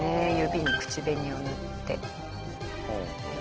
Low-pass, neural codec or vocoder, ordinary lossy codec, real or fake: 7.2 kHz; none; Opus, 16 kbps; real